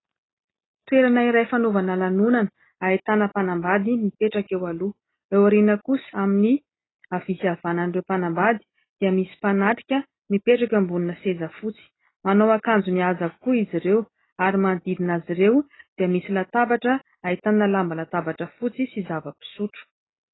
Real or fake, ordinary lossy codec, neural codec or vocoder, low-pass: real; AAC, 16 kbps; none; 7.2 kHz